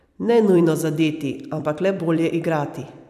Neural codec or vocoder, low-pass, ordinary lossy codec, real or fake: vocoder, 44.1 kHz, 128 mel bands every 256 samples, BigVGAN v2; 14.4 kHz; none; fake